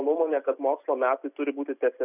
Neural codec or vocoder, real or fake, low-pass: none; real; 3.6 kHz